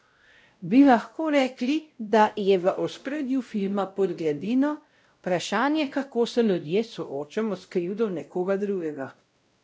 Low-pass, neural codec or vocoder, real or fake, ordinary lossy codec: none; codec, 16 kHz, 0.5 kbps, X-Codec, WavLM features, trained on Multilingual LibriSpeech; fake; none